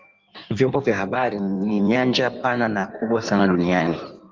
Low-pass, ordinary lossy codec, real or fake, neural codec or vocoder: 7.2 kHz; Opus, 32 kbps; fake; codec, 16 kHz in and 24 kHz out, 1.1 kbps, FireRedTTS-2 codec